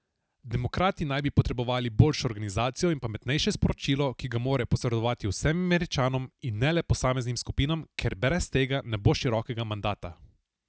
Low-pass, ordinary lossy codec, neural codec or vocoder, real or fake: none; none; none; real